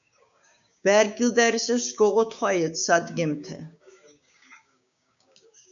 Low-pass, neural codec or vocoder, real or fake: 7.2 kHz; codec, 16 kHz, 6 kbps, DAC; fake